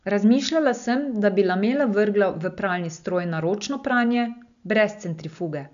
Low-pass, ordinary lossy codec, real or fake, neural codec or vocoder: 7.2 kHz; none; real; none